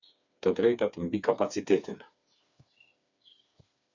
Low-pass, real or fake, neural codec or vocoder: 7.2 kHz; fake; codec, 16 kHz, 4 kbps, FreqCodec, smaller model